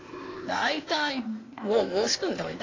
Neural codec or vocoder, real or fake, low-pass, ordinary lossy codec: codec, 16 kHz, 0.8 kbps, ZipCodec; fake; 7.2 kHz; AAC, 32 kbps